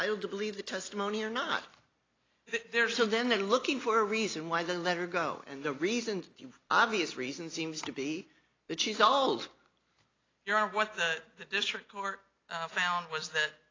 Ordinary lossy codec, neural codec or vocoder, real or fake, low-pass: AAC, 32 kbps; none; real; 7.2 kHz